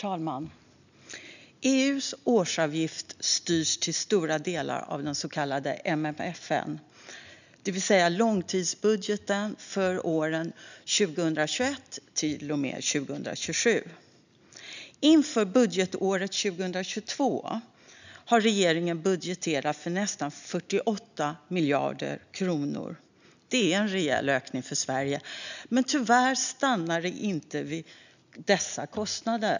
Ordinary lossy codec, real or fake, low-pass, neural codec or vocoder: none; real; 7.2 kHz; none